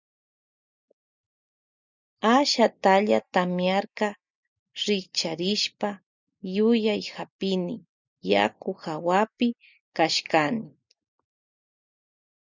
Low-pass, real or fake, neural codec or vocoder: 7.2 kHz; real; none